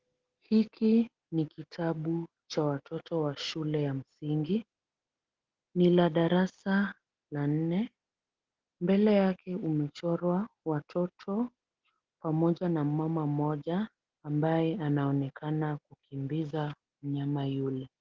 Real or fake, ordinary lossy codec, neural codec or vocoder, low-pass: real; Opus, 16 kbps; none; 7.2 kHz